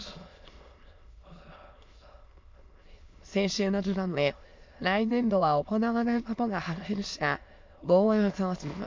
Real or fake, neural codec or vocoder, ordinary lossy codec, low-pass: fake; autoencoder, 22.05 kHz, a latent of 192 numbers a frame, VITS, trained on many speakers; MP3, 48 kbps; 7.2 kHz